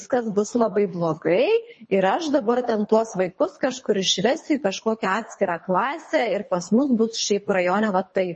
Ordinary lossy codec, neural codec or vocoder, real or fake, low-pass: MP3, 32 kbps; codec, 24 kHz, 3 kbps, HILCodec; fake; 10.8 kHz